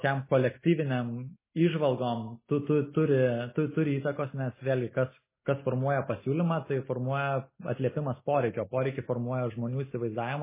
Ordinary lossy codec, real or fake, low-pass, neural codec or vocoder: MP3, 16 kbps; real; 3.6 kHz; none